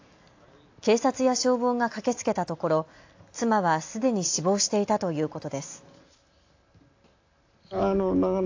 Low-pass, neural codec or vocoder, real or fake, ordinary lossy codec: 7.2 kHz; none; real; AAC, 48 kbps